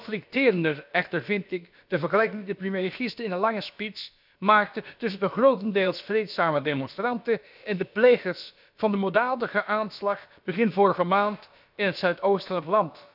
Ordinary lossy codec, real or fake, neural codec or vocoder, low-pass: none; fake; codec, 16 kHz, about 1 kbps, DyCAST, with the encoder's durations; 5.4 kHz